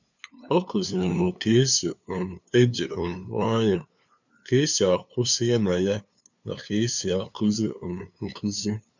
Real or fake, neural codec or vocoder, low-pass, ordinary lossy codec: fake; codec, 16 kHz, 2 kbps, FunCodec, trained on LibriTTS, 25 frames a second; 7.2 kHz; none